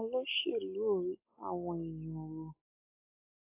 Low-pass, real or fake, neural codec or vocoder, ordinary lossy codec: 3.6 kHz; real; none; AAC, 24 kbps